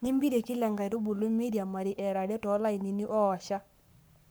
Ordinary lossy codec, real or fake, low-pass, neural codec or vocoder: none; fake; none; codec, 44.1 kHz, 7.8 kbps, Pupu-Codec